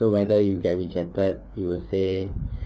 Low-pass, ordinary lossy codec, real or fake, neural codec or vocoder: none; none; fake; codec, 16 kHz, 4 kbps, FreqCodec, larger model